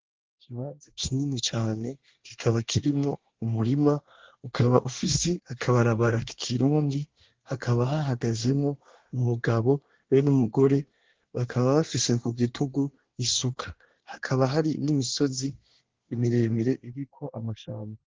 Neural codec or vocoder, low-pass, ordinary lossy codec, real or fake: codec, 24 kHz, 1 kbps, SNAC; 7.2 kHz; Opus, 16 kbps; fake